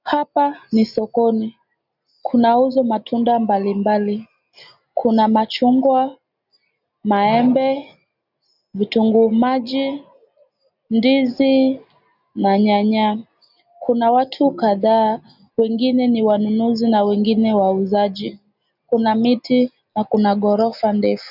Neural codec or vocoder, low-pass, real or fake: none; 5.4 kHz; real